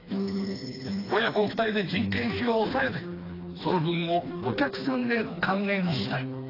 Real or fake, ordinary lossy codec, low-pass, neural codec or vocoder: fake; AAC, 24 kbps; 5.4 kHz; codec, 16 kHz, 2 kbps, FreqCodec, smaller model